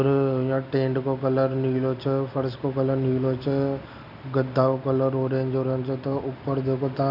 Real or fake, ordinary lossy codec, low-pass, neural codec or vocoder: real; none; 5.4 kHz; none